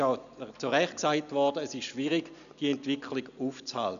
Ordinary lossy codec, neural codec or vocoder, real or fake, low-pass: none; none; real; 7.2 kHz